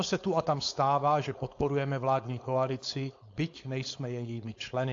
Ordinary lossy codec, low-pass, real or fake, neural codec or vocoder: AAC, 48 kbps; 7.2 kHz; fake; codec, 16 kHz, 4.8 kbps, FACodec